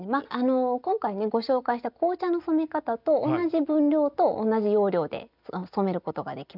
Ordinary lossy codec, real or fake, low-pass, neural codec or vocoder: none; real; 5.4 kHz; none